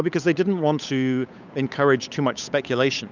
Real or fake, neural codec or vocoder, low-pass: fake; codec, 16 kHz, 8 kbps, FunCodec, trained on Chinese and English, 25 frames a second; 7.2 kHz